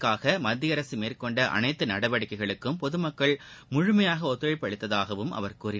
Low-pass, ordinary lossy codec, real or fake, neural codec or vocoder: none; none; real; none